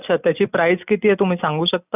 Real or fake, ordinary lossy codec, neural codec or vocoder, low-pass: real; none; none; 3.6 kHz